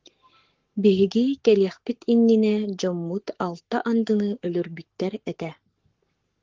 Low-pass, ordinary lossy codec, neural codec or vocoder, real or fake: 7.2 kHz; Opus, 16 kbps; codec, 44.1 kHz, 7.8 kbps, Pupu-Codec; fake